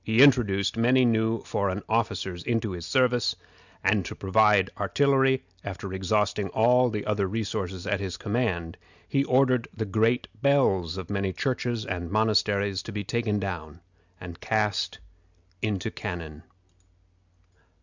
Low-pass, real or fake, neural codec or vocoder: 7.2 kHz; real; none